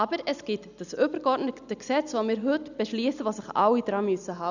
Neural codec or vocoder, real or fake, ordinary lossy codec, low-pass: none; real; none; 7.2 kHz